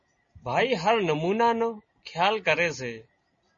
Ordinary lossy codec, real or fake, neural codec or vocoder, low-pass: MP3, 32 kbps; real; none; 7.2 kHz